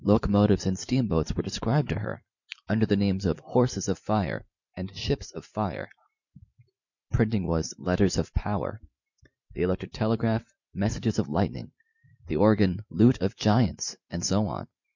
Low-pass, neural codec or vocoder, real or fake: 7.2 kHz; vocoder, 44.1 kHz, 128 mel bands every 512 samples, BigVGAN v2; fake